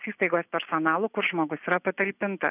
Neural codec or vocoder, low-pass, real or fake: none; 3.6 kHz; real